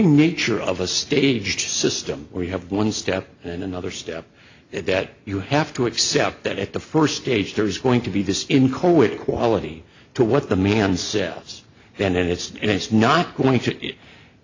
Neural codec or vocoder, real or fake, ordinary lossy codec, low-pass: none; real; AAC, 48 kbps; 7.2 kHz